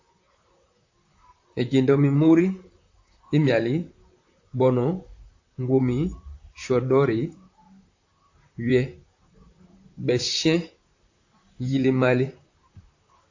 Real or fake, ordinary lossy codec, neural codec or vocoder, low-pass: fake; AAC, 48 kbps; vocoder, 44.1 kHz, 128 mel bands, Pupu-Vocoder; 7.2 kHz